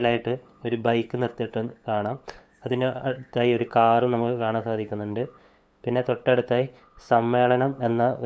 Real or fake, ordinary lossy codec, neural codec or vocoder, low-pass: fake; none; codec, 16 kHz, 8 kbps, FunCodec, trained on LibriTTS, 25 frames a second; none